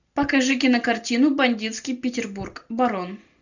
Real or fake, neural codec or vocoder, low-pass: real; none; 7.2 kHz